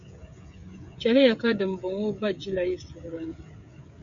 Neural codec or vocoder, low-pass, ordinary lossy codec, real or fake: codec, 16 kHz, 16 kbps, FreqCodec, smaller model; 7.2 kHz; MP3, 64 kbps; fake